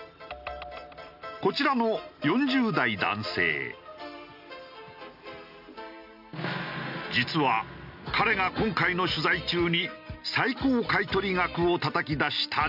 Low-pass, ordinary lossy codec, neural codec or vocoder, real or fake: 5.4 kHz; none; none; real